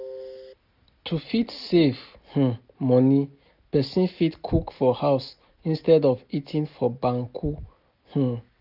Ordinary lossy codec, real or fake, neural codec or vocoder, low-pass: none; real; none; 5.4 kHz